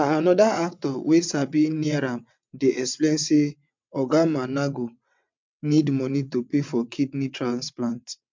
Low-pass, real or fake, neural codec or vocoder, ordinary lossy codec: 7.2 kHz; fake; vocoder, 22.05 kHz, 80 mel bands, WaveNeXt; MP3, 64 kbps